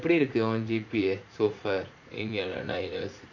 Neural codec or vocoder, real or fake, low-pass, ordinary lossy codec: vocoder, 44.1 kHz, 80 mel bands, Vocos; fake; 7.2 kHz; none